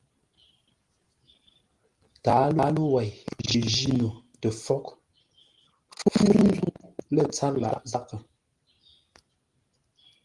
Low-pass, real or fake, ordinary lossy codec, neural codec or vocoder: 10.8 kHz; real; Opus, 24 kbps; none